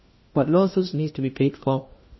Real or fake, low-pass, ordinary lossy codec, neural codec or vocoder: fake; 7.2 kHz; MP3, 24 kbps; codec, 16 kHz, 1 kbps, FunCodec, trained on LibriTTS, 50 frames a second